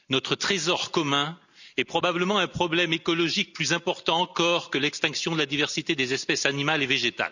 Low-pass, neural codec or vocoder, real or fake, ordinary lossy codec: 7.2 kHz; none; real; none